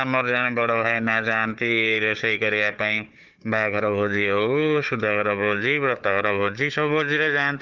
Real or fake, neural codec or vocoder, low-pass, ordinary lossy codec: fake; codec, 16 kHz, 4 kbps, FunCodec, trained on Chinese and English, 50 frames a second; 7.2 kHz; Opus, 32 kbps